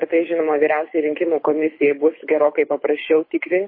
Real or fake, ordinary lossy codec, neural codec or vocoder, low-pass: fake; MP3, 24 kbps; codec, 24 kHz, 6 kbps, HILCodec; 5.4 kHz